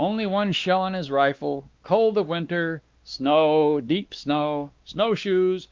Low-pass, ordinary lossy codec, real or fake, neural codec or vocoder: 7.2 kHz; Opus, 24 kbps; fake; codec, 16 kHz, 2 kbps, X-Codec, WavLM features, trained on Multilingual LibriSpeech